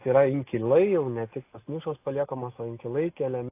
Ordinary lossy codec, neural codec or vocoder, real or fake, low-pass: AAC, 24 kbps; none; real; 3.6 kHz